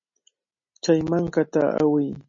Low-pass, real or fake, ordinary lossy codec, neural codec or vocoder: 7.2 kHz; real; MP3, 32 kbps; none